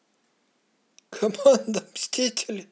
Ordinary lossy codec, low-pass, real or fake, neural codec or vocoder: none; none; real; none